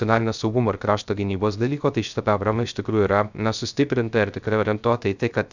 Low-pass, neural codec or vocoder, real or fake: 7.2 kHz; codec, 16 kHz, 0.3 kbps, FocalCodec; fake